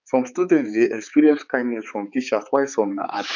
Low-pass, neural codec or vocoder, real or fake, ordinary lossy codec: 7.2 kHz; codec, 16 kHz, 4 kbps, X-Codec, HuBERT features, trained on balanced general audio; fake; none